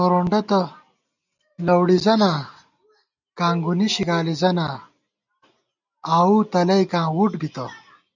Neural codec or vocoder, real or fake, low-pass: none; real; 7.2 kHz